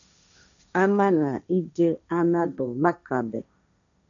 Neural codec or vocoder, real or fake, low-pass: codec, 16 kHz, 1.1 kbps, Voila-Tokenizer; fake; 7.2 kHz